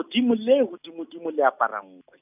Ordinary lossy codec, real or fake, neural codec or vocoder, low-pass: none; real; none; 3.6 kHz